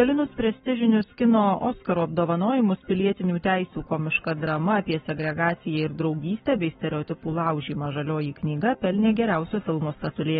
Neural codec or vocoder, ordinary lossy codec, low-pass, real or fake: codec, 44.1 kHz, 7.8 kbps, Pupu-Codec; AAC, 16 kbps; 19.8 kHz; fake